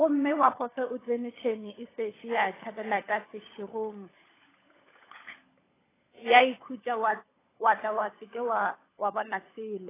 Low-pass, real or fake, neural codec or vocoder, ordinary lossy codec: 3.6 kHz; fake; codec, 16 kHz, 16 kbps, FunCodec, trained on LibriTTS, 50 frames a second; AAC, 16 kbps